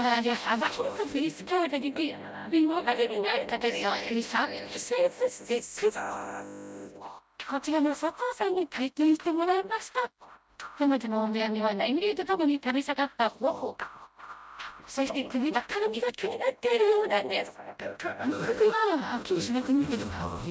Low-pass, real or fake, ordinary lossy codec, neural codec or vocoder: none; fake; none; codec, 16 kHz, 0.5 kbps, FreqCodec, smaller model